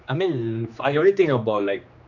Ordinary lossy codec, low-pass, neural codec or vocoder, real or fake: none; 7.2 kHz; codec, 16 kHz, 2 kbps, X-Codec, HuBERT features, trained on general audio; fake